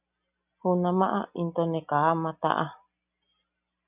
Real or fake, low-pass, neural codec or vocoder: real; 3.6 kHz; none